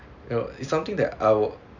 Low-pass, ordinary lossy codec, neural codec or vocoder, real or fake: 7.2 kHz; none; none; real